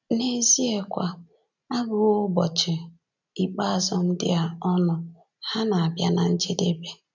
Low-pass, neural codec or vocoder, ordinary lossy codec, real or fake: 7.2 kHz; none; none; real